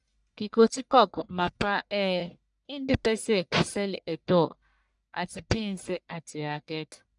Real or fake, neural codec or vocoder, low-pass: fake; codec, 44.1 kHz, 1.7 kbps, Pupu-Codec; 10.8 kHz